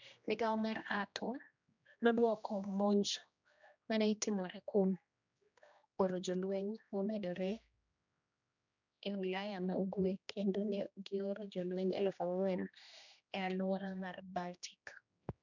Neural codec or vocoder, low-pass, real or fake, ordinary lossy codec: codec, 16 kHz, 1 kbps, X-Codec, HuBERT features, trained on general audio; 7.2 kHz; fake; none